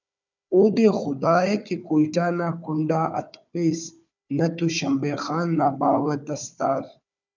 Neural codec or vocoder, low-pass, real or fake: codec, 16 kHz, 4 kbps, FunCodec, trained on Chinese and English, 50 frames a second; 7.2 kHz; fake